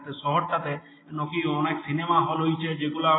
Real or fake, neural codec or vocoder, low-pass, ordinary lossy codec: real; none; 7.2 kHz; AAC, 16 kbps